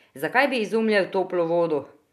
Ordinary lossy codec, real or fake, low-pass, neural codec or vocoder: none; real; 14.4 kHz; none